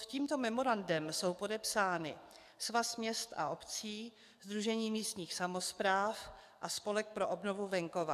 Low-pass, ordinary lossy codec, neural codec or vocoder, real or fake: 14.4 kHz; AAC, 96 kbps; codec, 44.1 kHz, 7.8 kbps, DAC; fake